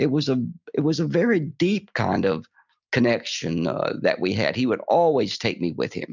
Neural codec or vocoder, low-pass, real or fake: none; 7.2 kHz; real